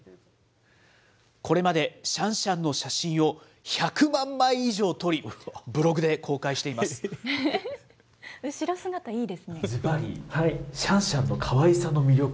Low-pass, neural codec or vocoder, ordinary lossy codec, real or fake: none; none; none; real